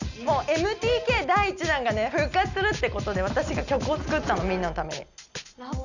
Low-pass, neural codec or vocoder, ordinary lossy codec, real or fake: 7.2 kHz; none; none; real